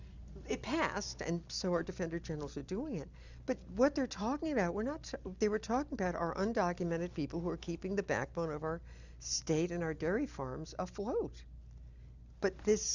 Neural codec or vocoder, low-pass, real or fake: none; 7.2 kHz; real